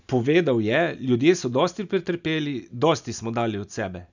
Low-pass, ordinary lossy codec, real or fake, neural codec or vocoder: 7.2 kHz; none; real; none